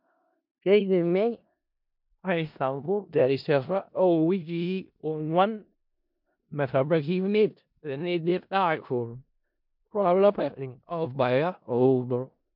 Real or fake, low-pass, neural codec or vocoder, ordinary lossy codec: fake; 5.4 kHz; codec, 16 kHz in and 24 kHz out, 0.4 kbps, LongCat-Audio-Codec, four codebook decoder; MP3, 48 kbps